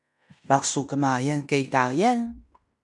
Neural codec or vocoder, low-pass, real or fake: codec, 16 kHz in and 24 kHz out, 0.9 kbps, LongCat-Audio-Codec, fine tuned four codebook decoder; 10.8 kHz; fake